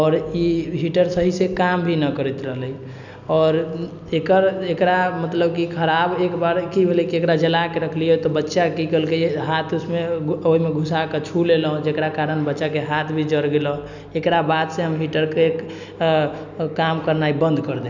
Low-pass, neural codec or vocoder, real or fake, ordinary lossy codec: 7.2 kHz; none; real; none